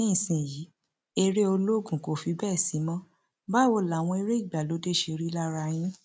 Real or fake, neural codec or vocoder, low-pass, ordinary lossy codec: real; none; none; none